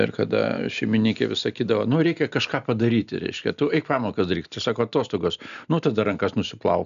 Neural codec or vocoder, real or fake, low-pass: none; real; 7.2 kHz